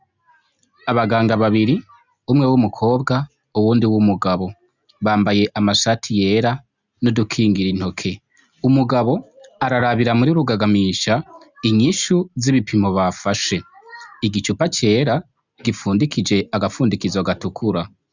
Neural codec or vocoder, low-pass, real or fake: none; 7.2 kHz; real